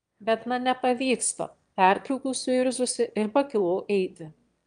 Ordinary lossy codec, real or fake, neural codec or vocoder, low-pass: Opus, 32 kbps; fake; autoencoder, 22.05 kHz, a latent of 192 numbers a frame, VITS, trained on one speaker; 9.9 kHz